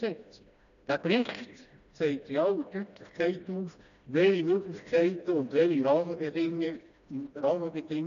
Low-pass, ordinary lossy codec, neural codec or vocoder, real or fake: 7.2 kHz; none; codec, 16 kHz, 1 kbps, FreqCodec, smaller model; fake